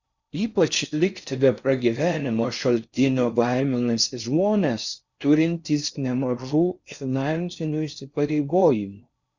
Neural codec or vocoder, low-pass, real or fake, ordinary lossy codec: codec, 16 kHz in and 24 kHz out, 0.6 kbps, FocalCodec, streaming, 4096 codes; 7.2 kHz; fake; Opus, 64 kbps